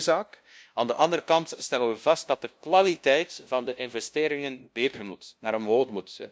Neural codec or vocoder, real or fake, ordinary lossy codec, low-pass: codec, 16 kHz, 0.5 kbps, FunCodec, trained on LibriTTS, 25 frames a second; fake; none; none